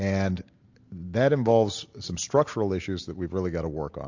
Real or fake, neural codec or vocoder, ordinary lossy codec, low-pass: real; none; AAC, 48 kbps; 7.2 kHz